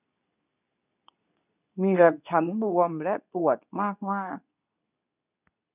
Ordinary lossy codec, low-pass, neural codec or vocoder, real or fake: none; 3.6 kHz; codec, 24 kHz, 0.9 kbps, WavTokenizer, medium speech release version 2; fake